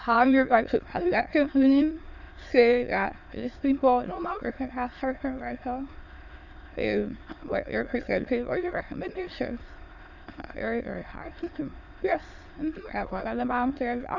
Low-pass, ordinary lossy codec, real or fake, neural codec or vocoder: 7.2 kHz; Opus, 64 kbps; fake; autoencoder, 22.05 kHz, a latent of 192 numbers a frame, VITS, trained on many speakers